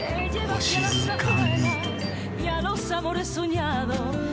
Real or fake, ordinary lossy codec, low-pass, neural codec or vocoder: real; none; none; none